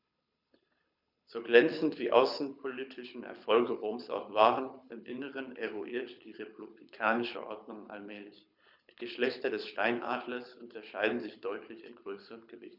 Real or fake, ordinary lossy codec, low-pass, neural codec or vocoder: fake; none; 5.4 kHz; codec, 24 kHz, 6 kbps, HILCodec